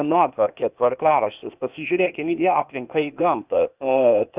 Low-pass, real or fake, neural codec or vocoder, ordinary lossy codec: 3.6 kHz; fake; codec, 16 kHz, 0.8 kbps, ZipCodec; Opus, 64 kbps